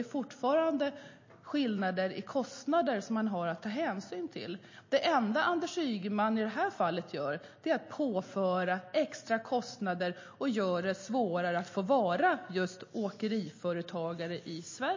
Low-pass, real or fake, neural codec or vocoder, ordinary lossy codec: 7.2 kHz; real; none; MP3, 32 kbps